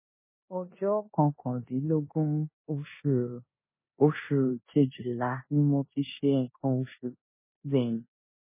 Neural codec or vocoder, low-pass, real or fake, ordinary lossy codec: codec, 16 kHz in and 24 kHz out, 0.9 kbps, LongCat-Audio-Codec, four codebook decoder; 3.6 kHz; fake; MP3, 16 kbps